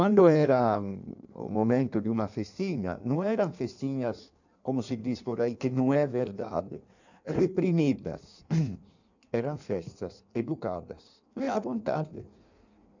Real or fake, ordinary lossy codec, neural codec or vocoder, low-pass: fake; none; codec, 16 kHz in and 24 kHz out, 1.1 kbps, FireRedTTS-2 codec; 7.2 kHz